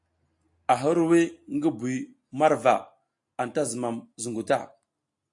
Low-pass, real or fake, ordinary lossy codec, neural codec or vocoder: 10.8 kHz; real; AAC, 64 kbps; none